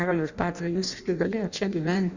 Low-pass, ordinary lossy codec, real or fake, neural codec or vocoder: 7.2 kHz; Opus, 64 kbps; fake; codec, 16 kHz in and 24 kHz out, 0.6 kbps, FireRedTTS-2 codec